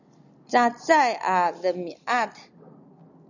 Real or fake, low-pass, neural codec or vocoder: real; 7.2 kHz; none